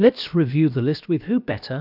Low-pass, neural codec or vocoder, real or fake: 5.4 kHz; codec, 16 kHz, about 1 kbps, DyCAST, with the encoder's durations; fake